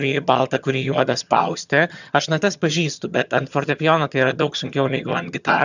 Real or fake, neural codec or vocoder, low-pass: fake; vocoder, 22.05 kHz, 80 mel bands, HiFi-GAN; 7.2 kHz